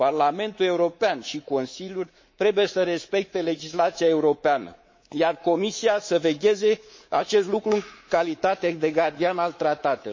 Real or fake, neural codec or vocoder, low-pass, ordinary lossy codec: fake; codec, 24 kHz, 3.1 kbps, DualCodec; 7.2 kHz; MP3, 32 kbps